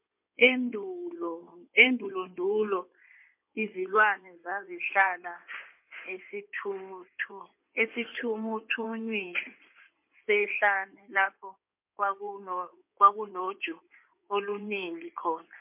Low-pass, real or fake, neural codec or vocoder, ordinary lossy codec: 3.6 kHz; fake; codec, 16 kHz in and 24 kHz out, 2.2 kbps, FireRedTTS-2 codec; MP3, 32 kbps